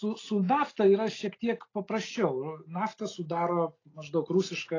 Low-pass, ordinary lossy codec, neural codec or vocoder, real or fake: 7.2 kHz; AAC, 32 kbps; none; real